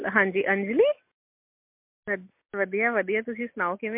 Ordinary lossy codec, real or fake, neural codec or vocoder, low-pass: MP3, 32 kbps; real; none; 3.6 kHz